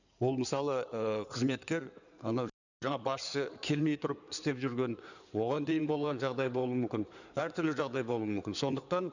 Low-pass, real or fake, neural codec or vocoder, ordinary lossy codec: 7.2 kHz; fake; codec, 16 kHz in and 24 kHz out, 2.2 kbps, FireRedTTS-2 codec; none